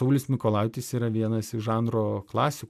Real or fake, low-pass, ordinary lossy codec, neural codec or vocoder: real; 14.4 kHz; MP3, 96 kbps; none